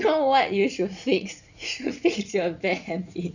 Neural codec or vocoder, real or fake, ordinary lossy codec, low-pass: vocoder, 44.1 kHz, 80 mel bands, Vocos; fake; none; 7.2 kHz